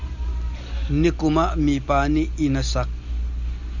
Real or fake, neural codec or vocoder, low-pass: real; none; 7.2 kHz